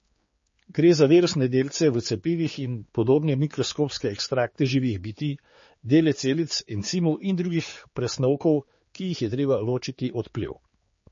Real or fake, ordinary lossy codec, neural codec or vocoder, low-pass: fake; MP3, 32 kbps; codec, 16 kHz, 4 kbps, X-Codec, HuBERT features, trained on general audio; 7.2 kHz